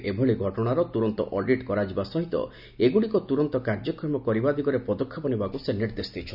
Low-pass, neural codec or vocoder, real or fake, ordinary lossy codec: 5.4 kHz; none; real; none